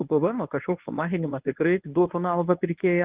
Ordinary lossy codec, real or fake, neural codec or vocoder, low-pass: Opus, 32 kbps; fake; codec, 24 kHz, 0.9 kbps, WavTokenizer, medium speech release version 1; 3.6 kHz